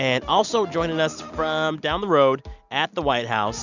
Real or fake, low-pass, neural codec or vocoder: real; 7.2 kHz; none